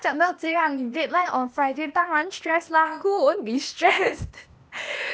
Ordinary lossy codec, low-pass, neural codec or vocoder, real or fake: none; none; codec, 16 kHz, 0.8 kbps, ZipCodec; fake